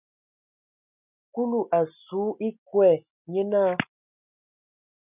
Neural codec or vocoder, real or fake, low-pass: none; real; 3.6 kHz